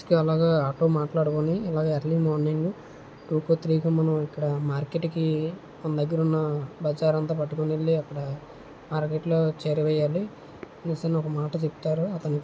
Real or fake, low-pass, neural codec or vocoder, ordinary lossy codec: real; none; none; none